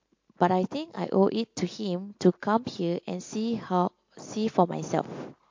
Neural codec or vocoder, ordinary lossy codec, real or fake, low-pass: none; MP3, 48 kbps; real; 7.2 kHz